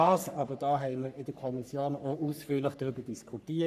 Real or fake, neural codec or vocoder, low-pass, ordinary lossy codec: fake; codec, 44.1 kHz, 3.4 kbps, Pupu-Codec; 14.4 kHz; none